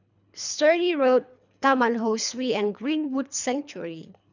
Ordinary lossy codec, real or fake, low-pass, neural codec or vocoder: none; fake; 7.2 kHz; codec, 24 kHz, 3 kbps, HILCodec